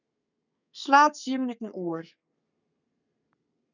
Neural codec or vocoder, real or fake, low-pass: codec, 32 kHz, 1.9 kbps, SNAC; fake; 7.2 kHz